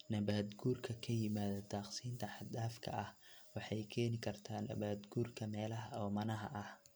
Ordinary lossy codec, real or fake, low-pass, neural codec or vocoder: none; real; none; none